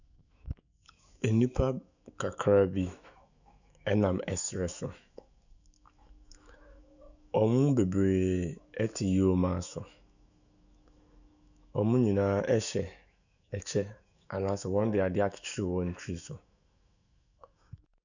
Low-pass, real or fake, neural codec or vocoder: 7.2 kHz; fake; autoencoder, 48 kHz, 128 numbers a frame, DAC-VAE, trained on Japanese speech